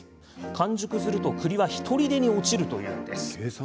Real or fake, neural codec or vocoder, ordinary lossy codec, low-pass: real; none; none; none